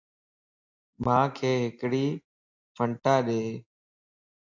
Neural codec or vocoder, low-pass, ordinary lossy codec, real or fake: none; 7.2 kHz; AAC, 48 kbps; real